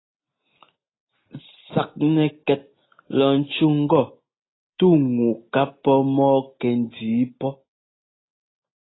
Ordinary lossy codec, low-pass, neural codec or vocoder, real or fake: AAC, 16 kbps; 7.2 kHz; none; real